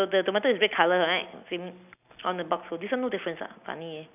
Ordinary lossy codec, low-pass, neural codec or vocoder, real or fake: none; 3.6 kHz; none; real